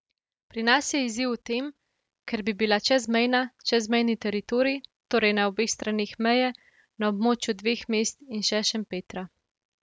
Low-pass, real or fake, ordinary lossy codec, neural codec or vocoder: none; real; none; none